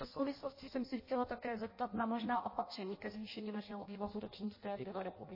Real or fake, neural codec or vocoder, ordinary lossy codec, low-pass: fake; codec, 16 kHz in and 24 kHz out, 0.6 kbps, FireRedTTS-2 codec; MP3, 24 kbps; 5.4 kHz